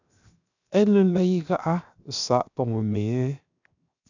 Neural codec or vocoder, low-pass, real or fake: codec, 16 kHz, 0.7 kbps, FocalCodec; 7.2 kHz; fake